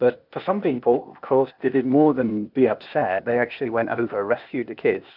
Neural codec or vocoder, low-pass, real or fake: codec, 16 kHz, 0.8 kbps, ZipCodec; 5.4 kHz; fake